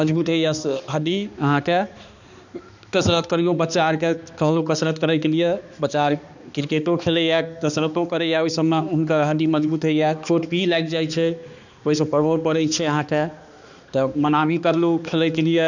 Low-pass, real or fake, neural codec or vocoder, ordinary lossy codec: 7.2 kHz; fake; codec, 16 kHz, 2 kbps, X-Codec, HuBERT features, trained on balanced general audio; none